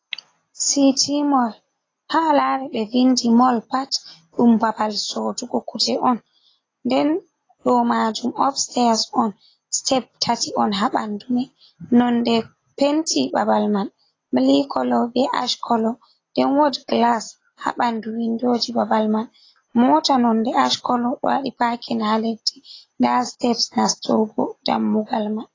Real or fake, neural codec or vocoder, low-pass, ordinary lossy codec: real; none; 7.2 kHz; AAC, 32 kbps